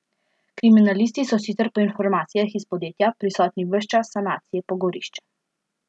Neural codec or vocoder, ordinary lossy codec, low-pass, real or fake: none; none; none; real